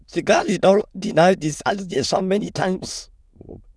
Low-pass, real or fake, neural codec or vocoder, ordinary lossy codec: none; fake; autoencoder, 22.05 kHz, a latent of 192 numbers a frame, VITS, trained on many speakers; none